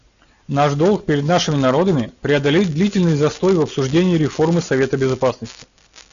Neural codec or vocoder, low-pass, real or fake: none; 7.2 kHz; real